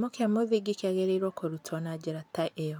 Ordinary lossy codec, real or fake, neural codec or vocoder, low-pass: none; real; none; 19.8 kHz